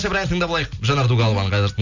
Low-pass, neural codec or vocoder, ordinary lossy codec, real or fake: 7.2 kHz; none; none; real